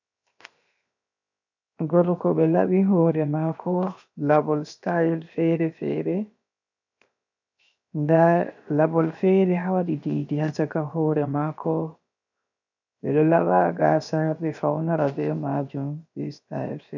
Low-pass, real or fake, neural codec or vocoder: 7.2 kHz; fake; codec, 16 kHz, 0.7 kbps, FocalCodec